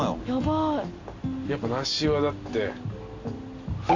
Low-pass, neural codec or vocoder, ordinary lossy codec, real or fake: 7.2 kHz; none; AAC, 48 kbps; real